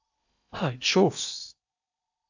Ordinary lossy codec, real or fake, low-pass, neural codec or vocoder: AAC, 48 kbps; fake; 7.2 kHz; codec, 16 kHz in and 24 kHz out, 0.8 kbps, FocalCodec, streaming, 65536 codes